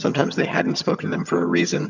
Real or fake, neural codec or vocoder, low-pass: fake; vocoder, 22.05 kHz, 80 mel bands, HiFi-GAN; 7.2 kHz